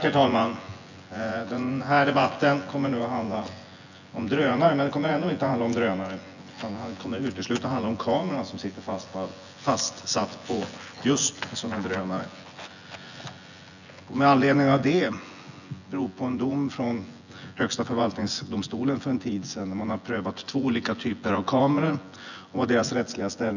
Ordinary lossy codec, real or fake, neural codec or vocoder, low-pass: none; fake; vocoder, 24 kHz, 100 mel bands, Vocos; 7.2 kHz